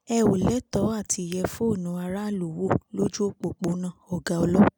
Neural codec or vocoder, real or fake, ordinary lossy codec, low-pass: none; real; none; none